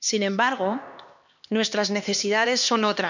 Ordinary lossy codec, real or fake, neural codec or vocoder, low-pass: none; fake; codec, 16 kHz, 2 kbps, X-Codec, HuBERT features, trained on LibriSpeech; 7.2 kHz